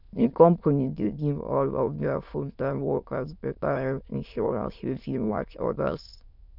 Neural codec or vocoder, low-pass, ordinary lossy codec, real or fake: autoencoder, 22.05 kHz, a latent of 192 numbers a frame, VITS, trained on many speakers; 5.4 kHz; none; fake